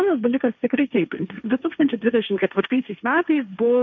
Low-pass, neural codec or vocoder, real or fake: 7.2 kHz; codec, 16 kHz, 1.1 kbps, Voila-Tokenizer; fake